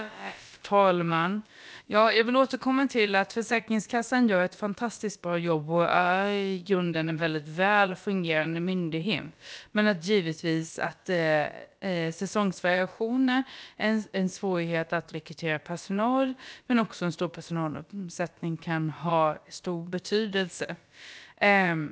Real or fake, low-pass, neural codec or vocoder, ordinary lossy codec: fake; none; codec, 16 kHz, about 1 kbps, DyCAST, with the encoder's durations; none